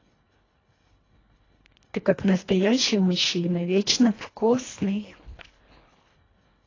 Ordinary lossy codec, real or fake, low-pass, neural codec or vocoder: AAC, 32 kbps; fake; 7.2 kHz; codec, 24 kHz, 1.5 kbps, HILCodec